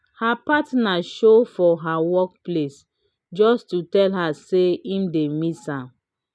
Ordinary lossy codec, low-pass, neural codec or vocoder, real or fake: none; none; none; real